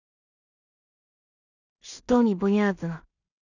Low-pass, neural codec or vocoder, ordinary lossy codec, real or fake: 7.2 kHz; codec, 16 kHz in and 24 kHz out, 0.4 kbps, LongCat-Audio-Codec, two codebook decoder; none; fake